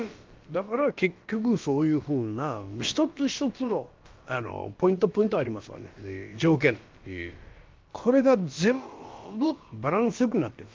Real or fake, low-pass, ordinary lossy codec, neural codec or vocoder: fake; 7.2 kHz; Opus, 32 kbps; codec, 16 kHz, about 1 kbps, DyCAST, with the encoder's durations